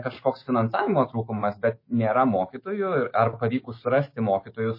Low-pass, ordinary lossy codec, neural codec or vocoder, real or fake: 5.4 kHz; MP3, 24 kbps; autoencoder, 48 kHz, 128 numbers a frame, DAC-VAE, trained on Japanese speech; fake